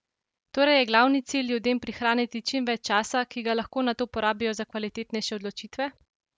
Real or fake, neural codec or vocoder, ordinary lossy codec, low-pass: real; none; none; none